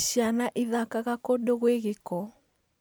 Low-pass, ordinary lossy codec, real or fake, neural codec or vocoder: none; none; real; none